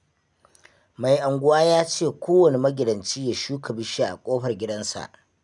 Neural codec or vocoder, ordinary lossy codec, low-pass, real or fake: none; none; 10.8 kHz; real